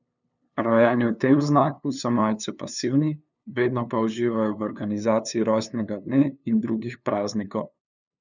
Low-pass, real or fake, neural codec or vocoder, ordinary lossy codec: 7.2 kHz; fake; codec, 16 kHz, 2 kbps, FunCodec, trained on LibriTTS, 25 frames a second; none